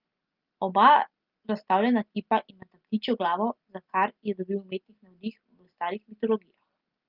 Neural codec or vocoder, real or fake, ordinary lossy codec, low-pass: none; real; Opus, 32 kbps; 5.4 kHz